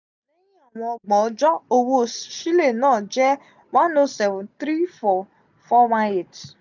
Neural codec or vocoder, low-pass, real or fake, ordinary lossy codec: none; 7.2 kHz; real; none